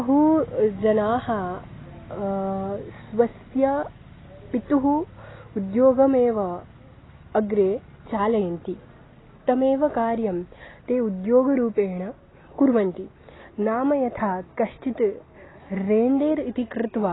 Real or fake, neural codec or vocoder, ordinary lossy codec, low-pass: real; none; AAC, 16 kbps; 7.2 kHz